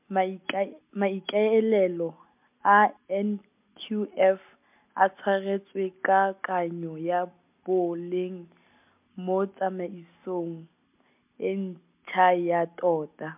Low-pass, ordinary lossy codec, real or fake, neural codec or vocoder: 3.6 kHz; MP3, 32 kbps; real; none